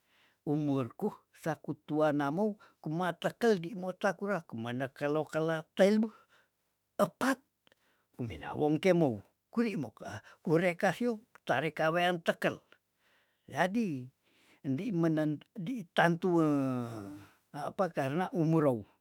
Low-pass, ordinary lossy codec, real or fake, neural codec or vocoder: 19.8 kHz; none; fake; autoencoder, 48 kHz, 32 numbers a frame, DAC-VAE, trained on Japanese speech